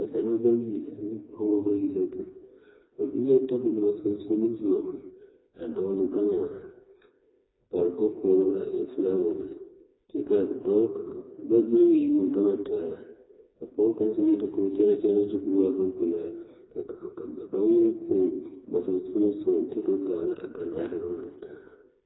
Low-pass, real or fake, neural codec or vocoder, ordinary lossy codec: 7.2 kHz; fake; codec, 16 kHz, 2 kbps, FreqCodec, smaller model; AAC, 16 kbps